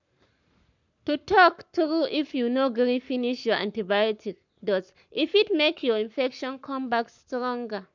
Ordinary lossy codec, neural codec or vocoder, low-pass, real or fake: none; codec, 44.1 kHz, 7.8 kbps, Pupu-Codec; 7.2 kHz; fake